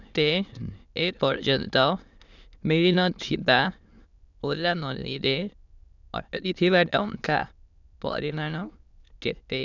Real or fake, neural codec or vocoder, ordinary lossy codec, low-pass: fake; autoencoder, 22.05 kHz, a latent of 192 numbers a frame, VITS, trained on many speakers; none; 7.2 kHz